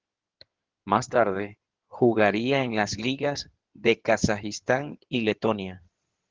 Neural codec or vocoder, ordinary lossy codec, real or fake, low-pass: codec, 16 kHz in and 24 kHz out, 2.2 kbps, FireRedTTS-2 codec; Opus, 16 kbps; fake; 7.2 kHz